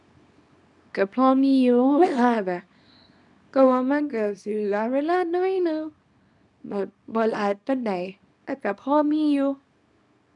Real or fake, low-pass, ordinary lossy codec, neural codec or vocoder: fake; 10.8 kHz; none; codec, 24 kHz, 0.9 kbps, WavTokenizer, small release